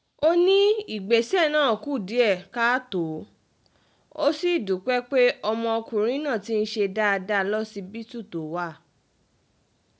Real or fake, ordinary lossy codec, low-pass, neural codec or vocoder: real; none; none; none